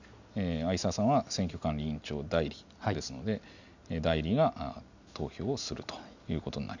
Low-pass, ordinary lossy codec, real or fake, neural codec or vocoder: 7.2 kHz; none; real; none